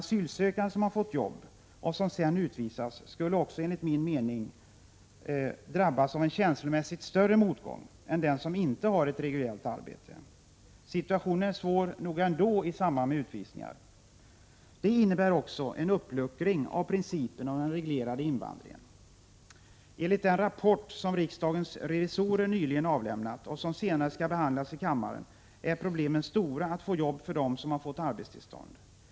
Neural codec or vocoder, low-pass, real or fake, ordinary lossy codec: none; none; real; none